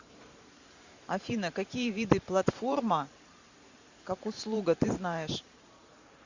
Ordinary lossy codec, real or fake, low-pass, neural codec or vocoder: Opus, 64 kbps; fake; 7.2 kHz; vocoder, 44.1 kHz, 128 mel bands every 512 samples, BigVGAN v2